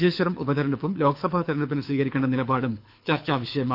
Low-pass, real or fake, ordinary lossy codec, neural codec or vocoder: 5.4 kHz; fake; none; codec, 24 kHz, 6 kbps, HILCodec